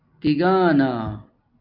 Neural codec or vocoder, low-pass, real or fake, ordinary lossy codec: none; 5.4 kHz; real; Opus, 24 kbps